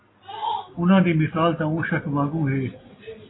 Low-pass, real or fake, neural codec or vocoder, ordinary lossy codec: 7.2 kHz; real; none; AAC, 16 kbps